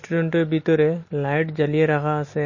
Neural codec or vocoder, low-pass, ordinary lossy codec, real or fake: none; 7.2 kHz; MP3, 32 kbps; real